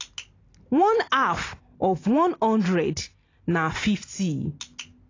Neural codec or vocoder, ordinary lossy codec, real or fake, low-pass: none; AAC, 32 kbps; real; 7.2 kHz